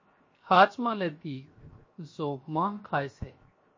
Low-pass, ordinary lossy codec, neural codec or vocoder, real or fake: 7.2 kHz; MP3, 32 kbps; codec, 16 kHz, 0.7 kbps, FocalCodec; fake